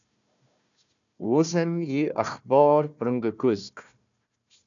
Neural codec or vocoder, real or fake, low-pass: codec, 16 kHz, 1 kbps, FunCodec, trained on Chinese and English, 50 frames a second; fake; 7.2 kHz